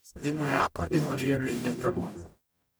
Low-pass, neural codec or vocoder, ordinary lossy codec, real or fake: none; codec, 44.1 kHz, 0.9 kbps, DAC; none; fake